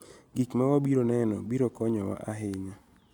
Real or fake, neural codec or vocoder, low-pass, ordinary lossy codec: real; none; 19.8 kHz; none